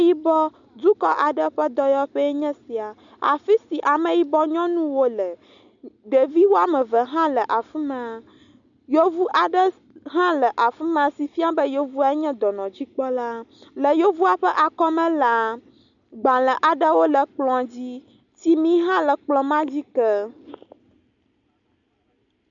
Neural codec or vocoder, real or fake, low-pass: none; real; 7.2 kHz